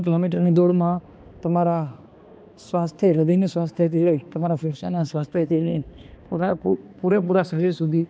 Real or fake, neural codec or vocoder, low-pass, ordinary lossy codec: fake; codec, 16 kHz, 2 kbps, X-Codec, HuBERT features, trained on balanced general audio; none; none